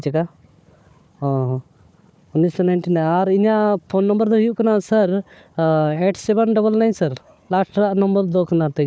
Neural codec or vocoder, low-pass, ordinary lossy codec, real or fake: codec, 16 kHz, 4 kbps, FunCodec, trained on Chinese and English, 50 frames a second; none; none; fake